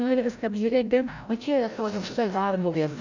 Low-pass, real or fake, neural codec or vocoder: 7.2 kHz; fake; codec, 16 kHz, 0.5 kbps, FreqCodec, larger model